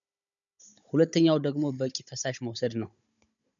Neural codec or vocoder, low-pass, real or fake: codec, 16 kHz, 16 kbps, FunCodec, trained on Chinese and English, 50 frames a second; 7.2 kHz; fake